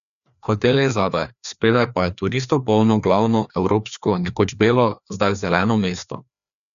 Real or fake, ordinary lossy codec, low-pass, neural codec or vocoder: fake; none; 7.2 kHz; codec, 16 kHz, 2 kbps, FreqCodec, larger model